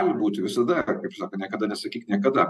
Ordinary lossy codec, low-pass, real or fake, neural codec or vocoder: AAC, 96 kbps; 14.4 kHz; real; none